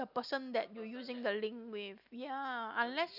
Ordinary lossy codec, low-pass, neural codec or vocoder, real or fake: none; 5.4 kHz; none; real